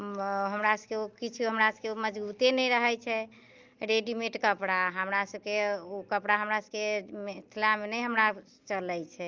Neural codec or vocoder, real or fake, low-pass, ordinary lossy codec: none; real; 7.2 kHz; Opus, 32 kbps